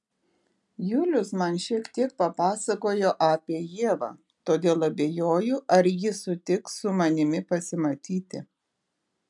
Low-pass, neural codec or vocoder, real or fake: 10.8 kHz; none; real